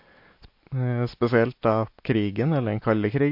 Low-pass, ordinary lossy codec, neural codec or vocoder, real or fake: 5.4 kHz; MP3, 32 kbps; none; real